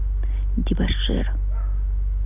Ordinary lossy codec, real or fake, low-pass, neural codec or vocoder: none; real; 3.6 kHz; none